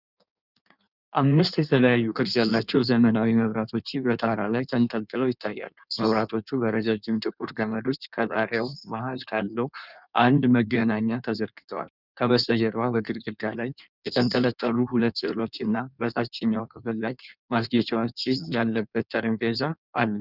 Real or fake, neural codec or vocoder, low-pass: fake; codec, 16 kHz in and 24 kHz out, 1.1 kbps, FireRedTTS-2 codec; 5.4 kHz